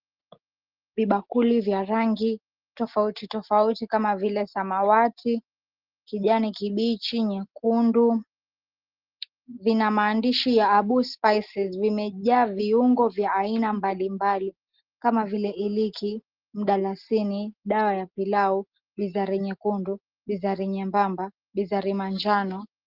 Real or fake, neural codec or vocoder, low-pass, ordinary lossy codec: real; none; 5.4 kHz; Opus, 16 kbps